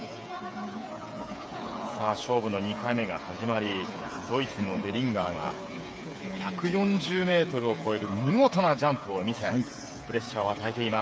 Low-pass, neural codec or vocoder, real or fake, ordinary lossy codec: none; codec, 16 kHz, 8 kbps, FreqCodec, smaller model; fake; none